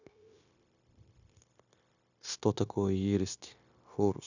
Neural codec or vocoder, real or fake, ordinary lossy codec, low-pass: codec, 16 kHz, 0.9 kbps, LongCat-Audio-Codec; fake; none; 7.2 kHz